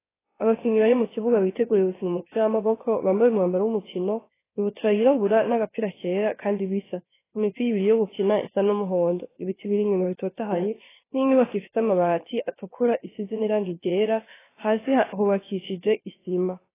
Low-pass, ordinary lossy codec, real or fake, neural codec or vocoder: 3.6 kHz; AAC, 16 kbps; fake; codec, 16 kHz, 0.7 kbps, FocalCodec